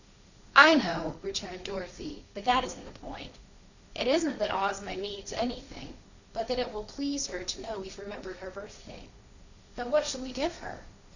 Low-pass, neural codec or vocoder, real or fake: 7.2 kHz; codec, 16 kHz, 1.1 kbps, Voila-Tokenizer; fake